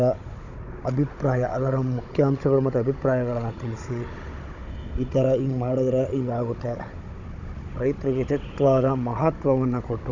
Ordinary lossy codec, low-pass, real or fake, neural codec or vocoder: none; 7.2 kHz; fake; codec, 16 kHz, 16 kbps, FunCodec, trained on Chinese and English, 50 frames a second